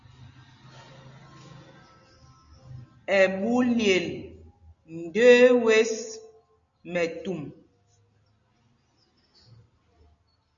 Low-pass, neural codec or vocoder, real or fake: 7.2 kHz; none; real